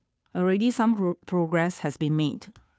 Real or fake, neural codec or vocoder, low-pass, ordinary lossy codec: fake; codec, 16 kHz, 2 kbps, FunCodec, trained on Chinese and English, 25 frames a second; none; none